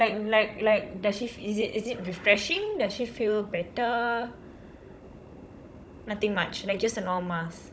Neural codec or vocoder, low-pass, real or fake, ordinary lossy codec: codec, 16 kHz, 16 kbps, FunCodec, trained on Chinese and English, 50 frames a second; none; fake; none